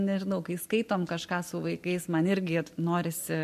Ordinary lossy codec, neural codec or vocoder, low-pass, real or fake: MP3, 64 kbps; none; 14.4 kHz; real